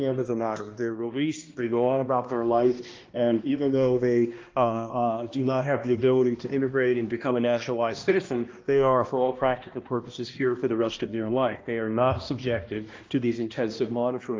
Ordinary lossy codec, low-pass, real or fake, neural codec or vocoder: Opus, 24 kbps; 7.2 kHz; fake; codec, 16 kHz, 1 kbps, X-Codec, HuBERT features, trained on balanced general audio